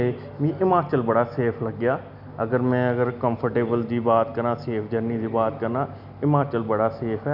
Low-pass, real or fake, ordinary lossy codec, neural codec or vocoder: 5.4 kHz; real; none; none